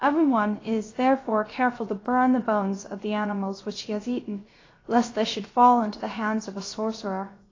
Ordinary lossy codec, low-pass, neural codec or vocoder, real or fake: AAC, 32 kbps; 7.2 kHz; codec, 16 kHz, 0.7 kbps, FocalCodec; fake